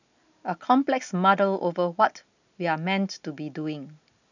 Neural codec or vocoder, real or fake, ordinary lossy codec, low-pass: none; real; none; 7.2 kHz